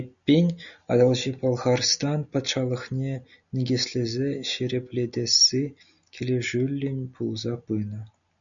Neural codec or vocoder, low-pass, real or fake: none; 7.2 kHz; real